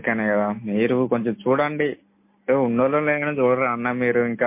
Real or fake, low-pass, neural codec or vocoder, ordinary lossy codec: real; 3.6 kHz; none; MP3, 24 kbps